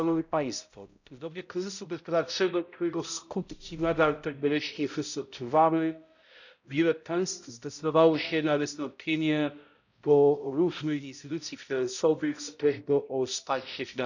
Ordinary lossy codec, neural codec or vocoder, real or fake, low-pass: none; codec, 16 kHz, 0.5 kbps, X-Codec, HuBERT features, trained on balanced general audio; fake; 7.2 kHz